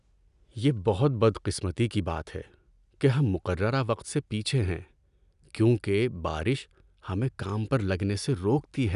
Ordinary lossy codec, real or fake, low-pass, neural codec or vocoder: none; real; 10.8 kHz; none